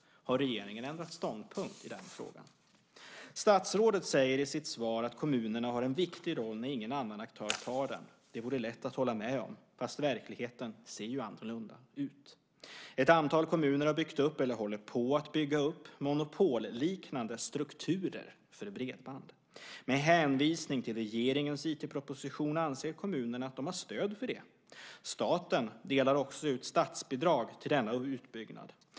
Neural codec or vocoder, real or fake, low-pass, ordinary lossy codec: none; real; none; none